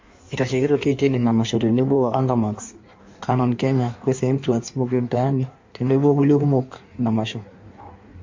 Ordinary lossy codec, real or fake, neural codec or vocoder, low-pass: MP3, 48 kbps; fake; codec, 16 kHz in and 24 kHz out, 1.1 kbps, FireRedTTS-2 codec; 7.2 kHz